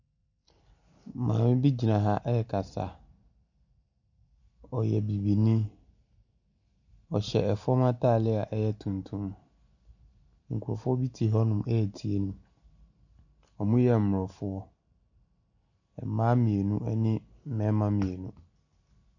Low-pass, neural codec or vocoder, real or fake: 7.2 kHz; none; real